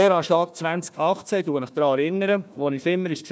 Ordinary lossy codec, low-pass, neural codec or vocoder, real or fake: none; none; codec, 16 kHz, 1 kbps, FunCodec, trained on Chinese and English, 50 frames a second; fake